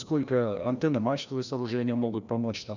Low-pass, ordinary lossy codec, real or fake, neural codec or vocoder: 7.2 kHz; none; fake; codec, 16 kHz, 1 kbps, FreqCodec, larger model